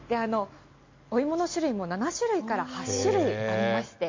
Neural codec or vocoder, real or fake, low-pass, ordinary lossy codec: none; real; 7.2 kHz; MP3, 48 kbps